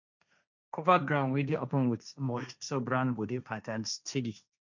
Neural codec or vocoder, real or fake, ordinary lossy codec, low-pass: codec, 16 kHz, 1.1 kbps, Voila-Tokenizer; fake; none; 7.2 kHz